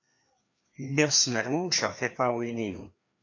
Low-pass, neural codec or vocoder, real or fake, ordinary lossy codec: 7.2 kHz; codec, 16 kHz, 2 kbps, FreqCodec, larger model; fake; AAC, 48 kbps